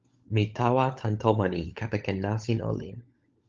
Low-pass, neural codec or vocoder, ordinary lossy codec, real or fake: 7.2 kHz; codec, 16 kHz, 16 kbps, FunCodec, trained on LibriTTS, 50 frames a second; Opus, 24 kbps; fake